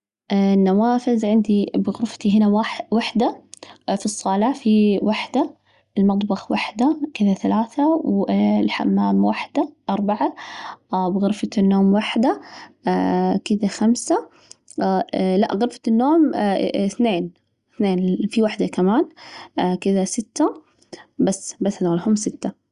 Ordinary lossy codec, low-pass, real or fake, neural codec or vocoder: Opus, 64 kbps; 10.8 kHz; real; none